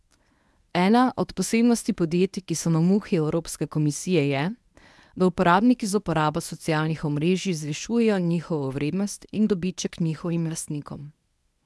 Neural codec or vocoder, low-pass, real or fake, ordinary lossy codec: codec, 24 kHz, 0.9 kbps, WavTokenizer, medium speech release version 1; none; fake; none